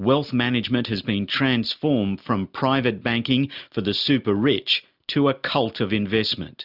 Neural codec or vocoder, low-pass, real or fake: none; 5.4 kHz; real